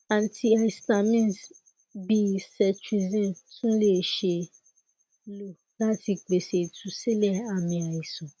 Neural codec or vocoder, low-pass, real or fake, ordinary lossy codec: none; none; real; none